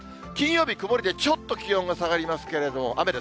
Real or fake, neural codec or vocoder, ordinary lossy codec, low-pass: real; none; none; none